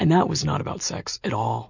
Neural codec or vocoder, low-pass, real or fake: none; 7.2 kHz; real